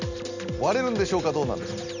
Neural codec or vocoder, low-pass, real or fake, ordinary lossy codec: none; 7.2 kHz; real; none